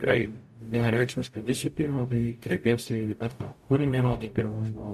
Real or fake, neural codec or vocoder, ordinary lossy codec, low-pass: fake; codec, 44.1 kHz, 0.9 kbps, DAC; MP3, 64 kbps; 14.4 kHz